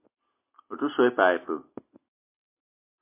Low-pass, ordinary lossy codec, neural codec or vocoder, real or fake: 3.6 kHz; MP3, 24 kbps; none; real